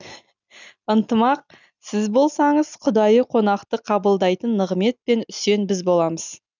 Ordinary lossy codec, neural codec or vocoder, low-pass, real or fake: none; none; 7.2 kHz; real